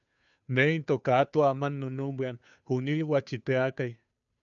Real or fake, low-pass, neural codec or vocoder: fake; 7.2 kHz; codec, 16 kHz, 2 kbps, FunCodec, trained on Chinese and English, 25 frames a second